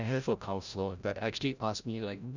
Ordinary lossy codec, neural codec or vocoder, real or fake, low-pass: none; codec, 16 kHz, 0.5 kbps, FreqCodec, larger model; fake; 7.2 kHz